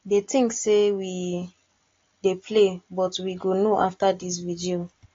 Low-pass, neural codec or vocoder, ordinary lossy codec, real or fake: 7.2 kHz; none; AAC, 32 kbps; real